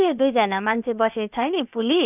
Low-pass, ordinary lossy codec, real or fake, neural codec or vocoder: 3.6 kHz; none; fake; codec, 16 kHz, about 1 kbps, DyCAST, with the encoder's durations